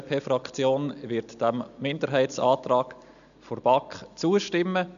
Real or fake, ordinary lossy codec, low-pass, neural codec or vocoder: real; none; 7.2 kHz; none